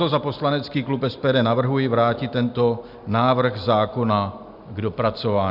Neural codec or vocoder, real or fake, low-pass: none; real; 5.4 kHz